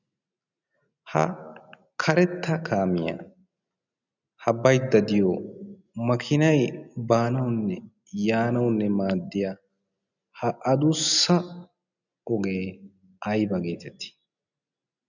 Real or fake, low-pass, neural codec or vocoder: real; 7.2 kHz; none